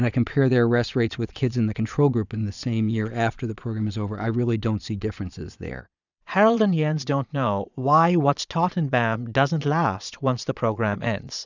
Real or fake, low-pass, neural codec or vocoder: fake; 7.2 kHz; vocoder, 22.05 kHz, 80 mel bands, Vocos